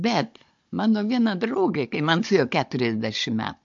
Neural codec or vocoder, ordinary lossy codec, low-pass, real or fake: codec, 16 kHz, 16 kbps, FunCodec, trained on Chinese and English, 50 frames a second; MP3, 48 kbps; 7.2 kHz; fake